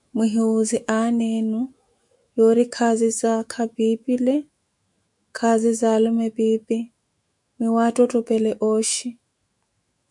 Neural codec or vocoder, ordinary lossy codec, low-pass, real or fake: autoencoder, 48 kHz, 128 numbers a frame, DAC-VAE, trained on Japanese speech; MP3, 96 kbps; 10.8 kHz; fake